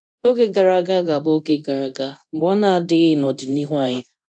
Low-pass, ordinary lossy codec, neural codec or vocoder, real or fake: 9.9 kHz; none; codec, 24 kHz, 0.9 kbps, DualCodec; fake